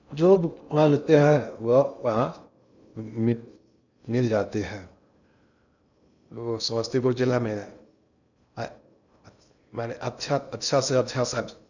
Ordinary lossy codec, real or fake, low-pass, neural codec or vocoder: none; fake; 7.2 kHz; codec, 16 kHz in and 24 kHz out, 0.6 kbps, FocalCodec, streaming, 2048 codes